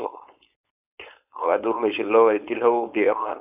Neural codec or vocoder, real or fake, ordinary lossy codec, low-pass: codec, 16 kHz, 4.8 kbps, FACodec; fake; AAC, 32 kbps; 3.6 kHz